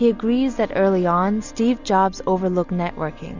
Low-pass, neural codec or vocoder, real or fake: 7.2 kHz; none; real